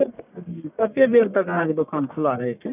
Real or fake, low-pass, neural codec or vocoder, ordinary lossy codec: fake; 3.6 kHz; codec, 44.1 kHz, 1.7 kbps, Pupu-Codec; none